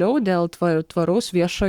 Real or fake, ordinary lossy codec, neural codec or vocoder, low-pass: fake; Opus, 64 kbps; codec, 44.1 kHz, 7.8 kbps, Pupu-Codec; 19.8 kHz